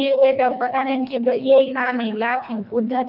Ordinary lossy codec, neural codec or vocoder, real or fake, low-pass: none; codec, 24 kHz, 1.5 kbps, HILCodec; fake; 5.4 kHz